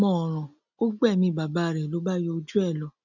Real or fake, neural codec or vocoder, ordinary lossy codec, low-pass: real; none; none; 7.2 kHz